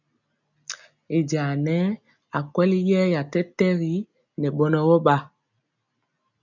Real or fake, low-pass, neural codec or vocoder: real; 7.2 kHz; none